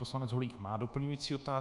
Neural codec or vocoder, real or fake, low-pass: codec, 24 kHz, 1.2 kbps, DualCodec; fake; 10.8 kHz